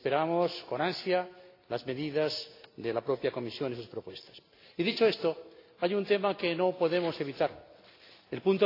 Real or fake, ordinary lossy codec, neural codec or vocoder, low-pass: real; AAC, 32 kbps; none; 5.4 kHz